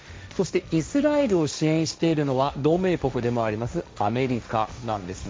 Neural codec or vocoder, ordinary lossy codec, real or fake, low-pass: codec, 16 kHz, 1.1 kbps, Voila-Tokenizer; none; fake; none